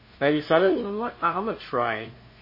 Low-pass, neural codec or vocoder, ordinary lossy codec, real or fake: 5.4 kHz; codec, 16 kHz, 0.5 kbps, FunCodec, trained on LibriTTS, 25 frames a second; MP3, 24 kbps; fake